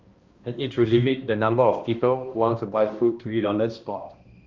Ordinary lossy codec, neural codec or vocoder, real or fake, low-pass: Opus, 32 kbps; codec, 16 kHz, 1 kbps, X-Codec, HuBERT features, trained on balanced general audio; fake; 7.2 kHz